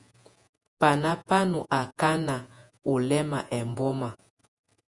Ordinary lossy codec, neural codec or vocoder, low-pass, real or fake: Opus, 64 kbps; vocoder, 48 kHz, 128 mel bands, Vocos; 10.8 kHz; fake